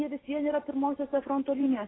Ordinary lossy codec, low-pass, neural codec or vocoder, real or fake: AAC, 16 kbps; 7.2 kHz; none; real